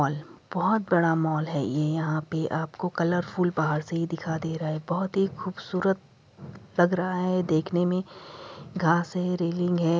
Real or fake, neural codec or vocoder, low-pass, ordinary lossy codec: real; none; none; none